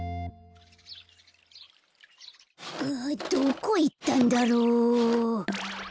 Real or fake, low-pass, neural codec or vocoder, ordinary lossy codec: real; none; none; none